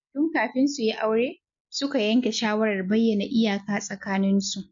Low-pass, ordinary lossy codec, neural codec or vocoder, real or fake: 7.2 kHz; MP3, 48 kbps; none; real